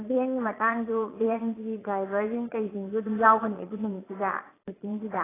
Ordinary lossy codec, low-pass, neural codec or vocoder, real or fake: AAC, 16 kbps; 3.6 kHz; vocoder, 22.05 kHz, 80 mel bands, Vocos; fake